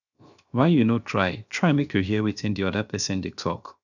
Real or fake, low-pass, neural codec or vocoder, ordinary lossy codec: fake; 7.2 kHz; codec, 16 kHz, 0.7 kbps, FocalCodec; none